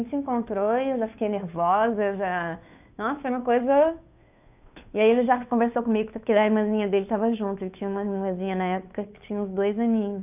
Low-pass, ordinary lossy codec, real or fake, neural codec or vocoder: 3.6 kHz; none; fake; codec, 16 kHz, 2 kbps, FunCodec, trained on Chinese and English, 25 frames a second